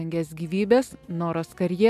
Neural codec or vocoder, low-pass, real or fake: none; 14.4 kHz; real